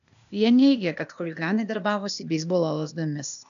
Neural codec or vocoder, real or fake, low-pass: codec, 16 kHz, 0.8 kbps, ZipCodec; fake; 7.2 kHz